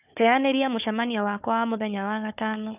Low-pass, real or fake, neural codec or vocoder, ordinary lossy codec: 3.6 kHz; fake; codec, 16 kHz, 4 kbps, FunCodec, trained on Chinese and English, 50 frames a second; none